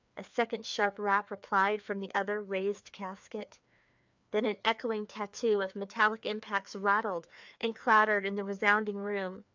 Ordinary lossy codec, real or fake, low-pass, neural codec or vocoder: MP3, 64 kbps; fake; 7.2 kHz; codec, 16 kHz, 2 kbps, FreqCodec, larger model